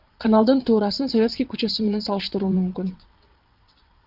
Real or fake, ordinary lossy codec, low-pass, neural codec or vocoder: fake; Opus, 24 kbps; 5.4 kHz; vocoder, 22.05 kHz, 80 mel bands, WaveNeXt